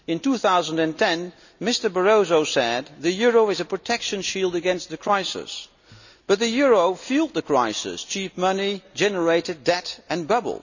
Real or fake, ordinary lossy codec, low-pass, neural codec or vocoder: real; none; 7.2 kHz; none